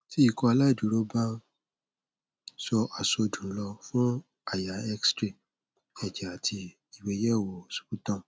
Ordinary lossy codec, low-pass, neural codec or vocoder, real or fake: none; none; none; real